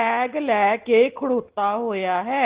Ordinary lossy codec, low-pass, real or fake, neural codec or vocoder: Opus, 16 kbps; 3.6 kHz; real; none